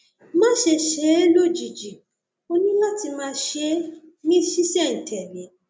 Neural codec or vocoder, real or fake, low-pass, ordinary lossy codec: none; real; none; none